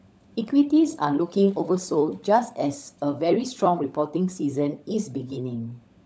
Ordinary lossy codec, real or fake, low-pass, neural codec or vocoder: none; fake; none; codec, 16 kHz, 16 kbps, FunCodec, trained on LibriTTS, 50 frames a second